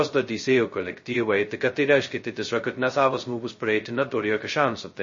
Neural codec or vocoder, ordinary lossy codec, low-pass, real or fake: codec, 16 kHz, 0.2 kbps, FocalCodec; MP3, 32 kbps; 7.2 kHz; fake